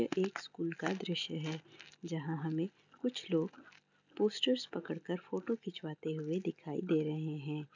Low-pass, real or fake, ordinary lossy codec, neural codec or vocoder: 7.2 kHz; real; none; none